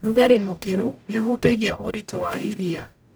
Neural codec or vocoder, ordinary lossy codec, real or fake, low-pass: codec, 44.1 kHz, 0.9 kbps, DAC; none; fake; none